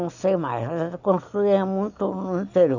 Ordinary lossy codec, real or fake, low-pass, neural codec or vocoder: none; real; 7.2 kHz; none